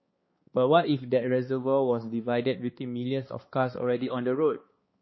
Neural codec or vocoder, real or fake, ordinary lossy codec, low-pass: codec, 16 kHz, 2 kbps, X-Codec, HuBERT features, trained on balanced general audio; fake; MP3, 24 kbps; 7.2 kHz